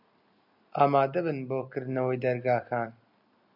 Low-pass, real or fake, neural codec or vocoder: 5.4 kHz; real; none